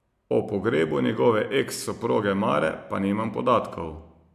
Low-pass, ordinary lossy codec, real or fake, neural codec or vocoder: 14.4 kHz; MP3, 96 kbps; real; none